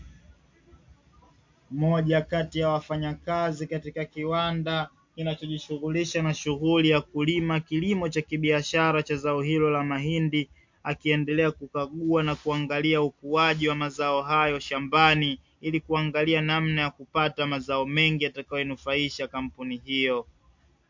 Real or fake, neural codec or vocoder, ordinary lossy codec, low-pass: real; none; MP3, 48 kbps; 7.2 kHz